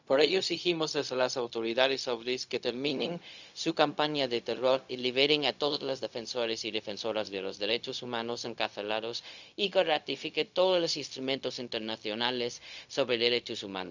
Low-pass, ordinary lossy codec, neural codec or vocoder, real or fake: 7.2 kHz; none; codec, 16 kHz, 0.4 kbps, LongCat-Audio-Codec; fake